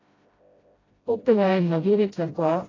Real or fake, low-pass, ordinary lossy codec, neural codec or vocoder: fake; 7.2 kHz; AAC, 32 kbps; codec, 16 kHz, 0.5 kbps, FreqCodec, smaller model